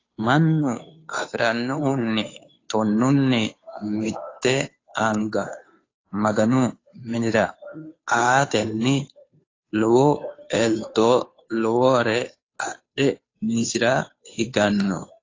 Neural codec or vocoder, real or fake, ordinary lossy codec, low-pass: codec, 16 kHz, 2 kbps, FunCodec, trained on Chinese and English, 25 frames a second; fake; AAC, 32 kbps; 7.2 kHz